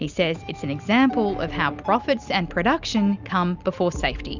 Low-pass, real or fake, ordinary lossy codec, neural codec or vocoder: 7.2 kHz; real; Opus, 64 kbps; none